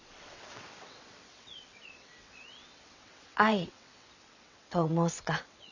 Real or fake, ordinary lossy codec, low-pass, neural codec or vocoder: fake; none; 7.2 kHz; vocoder, 22.05 kHz, 80 mel bands, WaveNeXt